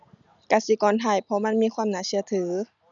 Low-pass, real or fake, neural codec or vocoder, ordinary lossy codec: 7.2 kHz; real; none; none